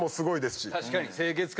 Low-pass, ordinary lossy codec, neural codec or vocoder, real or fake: none; none; none; real